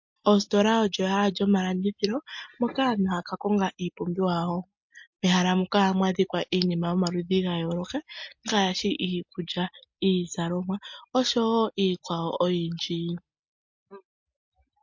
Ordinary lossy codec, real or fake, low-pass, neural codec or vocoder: MP3, 48 kbps; real; 7.2 kHz; none